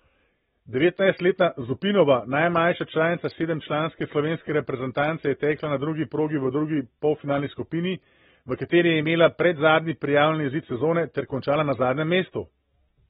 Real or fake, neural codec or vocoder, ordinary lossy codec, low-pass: real; none; AAC, 16 kbps; 7.2 kHz